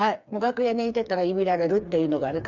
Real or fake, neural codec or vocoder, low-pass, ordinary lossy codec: fake; codec, 16 kHz, 4 kbps, FreqCodec, smaller model; 7.2 kHz; none